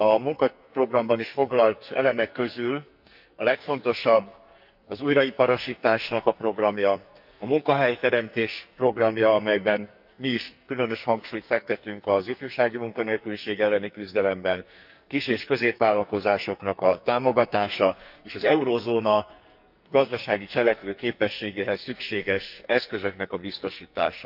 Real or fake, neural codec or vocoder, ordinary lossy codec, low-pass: fake; codec, 44.1 kHz, 2.6 kbps, SNAC; none; 5.4 kHz